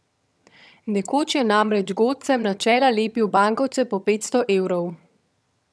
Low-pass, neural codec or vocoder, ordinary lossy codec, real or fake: none; vocoder, 22.05 kHz, 80 mel bands, HiFi-GAN; none; fake